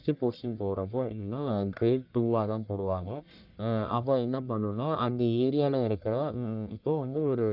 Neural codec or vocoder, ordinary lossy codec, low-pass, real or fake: codec, 44.1 kHz, 1.7 kbps, Pupu-Codec; none; 5.4 kHz; fake